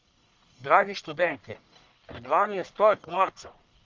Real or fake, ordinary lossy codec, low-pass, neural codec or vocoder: fake; Opus, 32 kbps; 7.2 kHz; codec, 44.1 kHz, 1.7 kbps, Pupu-Codec